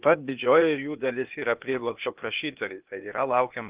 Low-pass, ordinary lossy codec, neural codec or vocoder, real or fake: 3.6 kHz; Opus, 32 kbps; codec, 16 kHz, 0.8 kbps, ZipCodec; fake